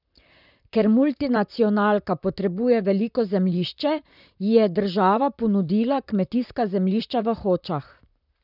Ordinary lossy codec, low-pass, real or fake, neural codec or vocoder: none; 5.4 kHz; fake; vocoder, 44.1 kHz, 128 mel bands, Pupu-Vocoder